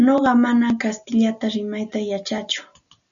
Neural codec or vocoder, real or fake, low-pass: none; real; 7.2 kHz